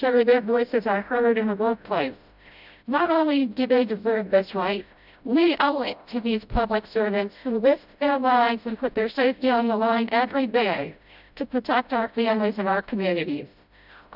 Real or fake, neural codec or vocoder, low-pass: fake; codec, 16 kHz, 0.5 kbps, FreqCodec, smaller model; 5.4 kHz